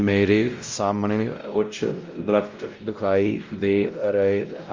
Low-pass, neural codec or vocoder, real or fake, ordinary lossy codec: 7.2 kHz; codec, 16 kHz, 0.5 kbps, X-Codec, WavLM features, trained on Multilingual LibriSpeech; fake; Opus, 32 kbps